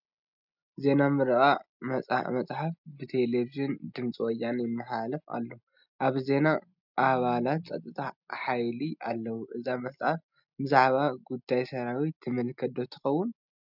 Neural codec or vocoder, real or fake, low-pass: none; real; 5.4 kHz